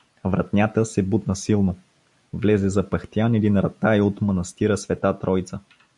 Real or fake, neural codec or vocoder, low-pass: real; none; 10.8 kHz